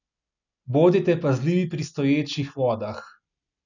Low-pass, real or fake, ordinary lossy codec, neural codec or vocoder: 7.2 kHz; real; none; none